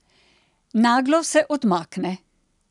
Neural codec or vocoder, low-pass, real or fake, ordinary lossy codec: none; 10.8 kHz; real; none